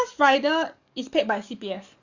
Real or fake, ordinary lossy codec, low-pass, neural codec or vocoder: fake; Opus, 64 kbps; 7.2 kHz; codec, 44.1 kHz, 7.8 kbps, DAC